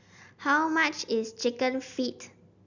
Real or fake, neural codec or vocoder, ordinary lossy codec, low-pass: real; none; none; 7.2 kHz